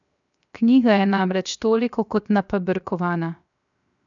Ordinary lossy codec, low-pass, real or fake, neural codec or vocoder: none; 7.2 kHz; fake; codec, 16 kHz, 0.7 kbps, FocalCodec